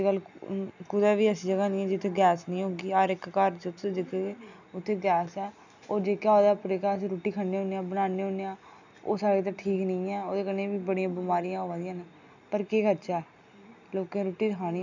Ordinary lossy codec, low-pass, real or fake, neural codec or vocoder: none; 7.2 kHz; real; none